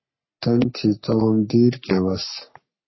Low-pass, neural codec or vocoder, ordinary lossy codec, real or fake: 7.2 kHz; codec, 44.1 kHz, 3.4 kbps, Pupu-Codec; MP3, 24 kbps; fake